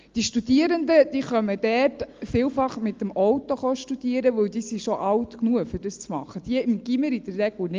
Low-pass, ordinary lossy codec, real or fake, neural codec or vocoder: 7.2 kHz; Opus, 32 kbps; real; none